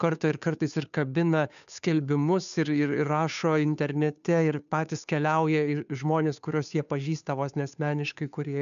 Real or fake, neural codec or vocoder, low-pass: fake; codec, 16 kHz, 2 kbps, FunCodec, trained on Chinese and English, 25 frames a second; 7.2 kHz